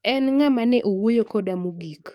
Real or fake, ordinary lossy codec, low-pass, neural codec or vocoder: fake; none; 19.8 kHz; codec, 44.1 kHz, 7.8 kbps, DAC